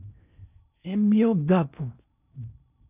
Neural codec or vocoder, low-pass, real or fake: codec, 16 kHz in and 24 kHz out, 0.6 kbps, FocalCodec, streaming, 4096 codes; 3.6 kHz; fake